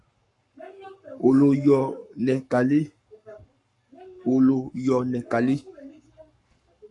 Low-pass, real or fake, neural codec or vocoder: 10.8 kHz; fake; codec, 44.1 kHz, 7.8 kbps, Pupu-Codec